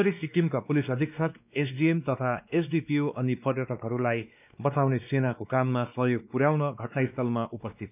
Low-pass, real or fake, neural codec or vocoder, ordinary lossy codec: 3.6 kHz; fake; codec, 16 kHz, 2 kbps, X-Codec, WavLM features, trained on Multilingual LibriSpeech; none